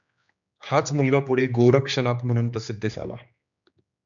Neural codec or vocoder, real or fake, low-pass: codec, 16 kHz, 2 kbps, X-Codec, HuBERT features, trained on general audio; fake; 7.2 kHz